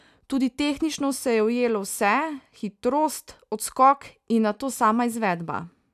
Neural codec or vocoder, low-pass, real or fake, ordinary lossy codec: none; 14.4 kHz; real; none